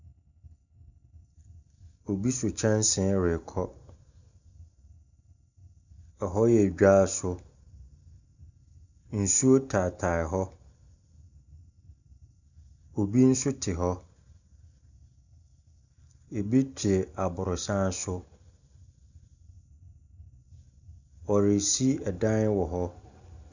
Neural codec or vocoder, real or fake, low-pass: none; real; 7.2 kHz